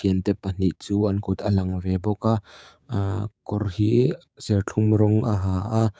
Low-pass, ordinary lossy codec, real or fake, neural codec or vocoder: none; none; fake; codec, 16 kHz, 6 kbps, DAC